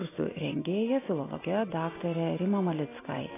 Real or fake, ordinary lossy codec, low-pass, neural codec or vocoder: real; AAC, 16 kbps; 3.6 kHz; none